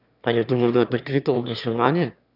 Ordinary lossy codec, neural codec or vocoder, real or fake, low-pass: none; autoencoder, 22.05 kHz, a latent of 192 numbers a frame, VITS, trained on one speaker; fake; 5.4 kHz